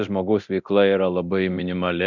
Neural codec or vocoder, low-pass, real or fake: codec, 24 kHz, 0.9 kbps, DualCodec; 7.2 kHz; fake